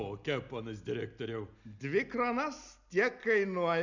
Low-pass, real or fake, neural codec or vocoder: 7.2 kHz; real; none